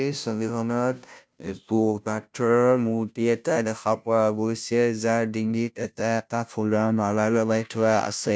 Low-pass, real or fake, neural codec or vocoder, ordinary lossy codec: none; fake; codec, 16 kHz, 0.5 kbps, FunCodec, trained on Chinese and English, 25 frames a second; none